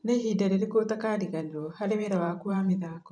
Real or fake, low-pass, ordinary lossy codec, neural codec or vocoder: fake; 9.9 kHz; none; vocoder, 44.1 kHz, 128 mel bands every 512 samples, BigVGAN v2